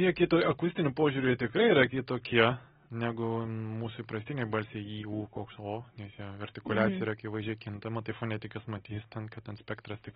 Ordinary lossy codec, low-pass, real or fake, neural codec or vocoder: AAC, 16 kbps; 7.2 kHz; real; none